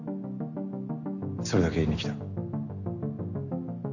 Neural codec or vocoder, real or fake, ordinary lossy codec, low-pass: none; real; none; 7.2 kHz